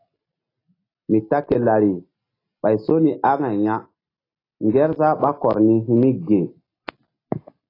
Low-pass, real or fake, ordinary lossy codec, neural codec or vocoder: 5.4 kHz; real; AAC, 24 kbps; none